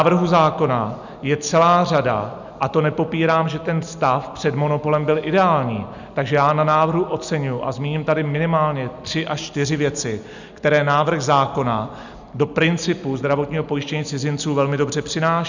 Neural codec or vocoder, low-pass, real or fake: none; 7.2 kHz; real